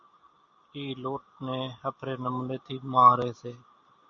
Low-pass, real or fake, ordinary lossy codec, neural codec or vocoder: 7.2 kHz; real; MP3, 96 kbps; none